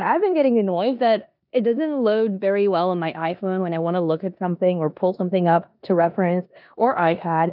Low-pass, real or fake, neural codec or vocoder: 5.4 kHz; fake; codec, 16 kHz in and 24 kHz out, 0.9 kbps, LongCat-Audio-Codec, four codebook decoder